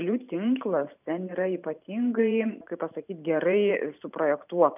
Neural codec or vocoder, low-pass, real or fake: vocoder, 44.1 kHz, 128 mel bands every 512 samples, BigVGAN v2; 3.6 kHz; fake